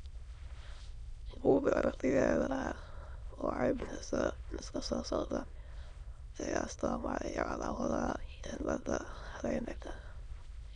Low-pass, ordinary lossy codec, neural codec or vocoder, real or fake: 9.9 kHz; none; autoencoder, 22.05 kHz, a latent of 192 numbers a frame, VITS, trained on many speakers; fake